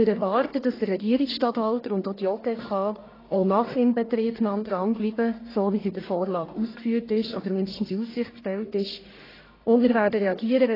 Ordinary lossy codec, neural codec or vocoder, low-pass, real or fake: AAC, 24 kbps; codec, 44.1 kHz, 1.7 kbps, Pupu-Codec; 5.4 kHz; fake